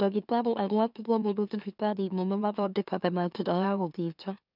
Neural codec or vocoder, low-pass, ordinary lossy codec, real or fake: autoencoder, 44.1 kHz, a latent of 192 numbers a frame, MeloTTS; 5.4 kHz; none; fake